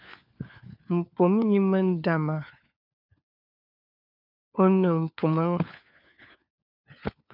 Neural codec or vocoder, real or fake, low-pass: codec, 16 kHz, 4 kbps, FunCodec, trained on LibriTTS, 50 frames a second; fake; 5.4 kHz